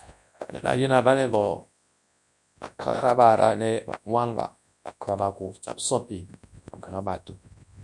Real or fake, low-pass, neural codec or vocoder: fake; 10.8 kHz; codec, 24 kHz, 0.9 kbps, WavTokenizer, large speech release